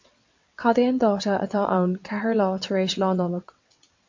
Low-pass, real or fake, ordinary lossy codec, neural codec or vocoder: 7.2 kHz; fake; MP3, 48 kbps; vocoder, 22.05 kHz, 80 mel bands, WaveNeXt